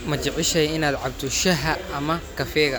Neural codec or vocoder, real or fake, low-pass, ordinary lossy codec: none; real; none; none